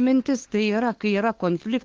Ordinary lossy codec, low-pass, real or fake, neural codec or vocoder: Opus, 16 kbps; 7.2 kHz; fake; codec, 16 kHz, 2 kbps, FunCodec, trained on LibriTTS, 25 frames a second